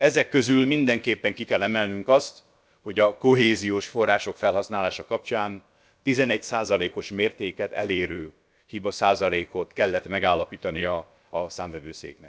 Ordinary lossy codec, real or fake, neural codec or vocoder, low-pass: none; fake; codec, 16 kHz, about 1 kbps, DyCAST, with the encoder's durations; none